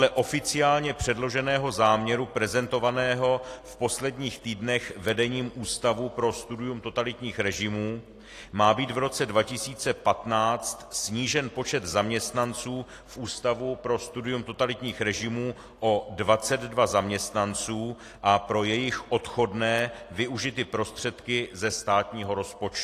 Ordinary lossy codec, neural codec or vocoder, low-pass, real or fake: AAC, 48 kbps; none; 14.4 kHz; real